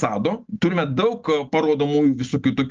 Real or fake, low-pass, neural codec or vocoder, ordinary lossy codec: real; 7.2 kHz; none; Opus, 24 kbps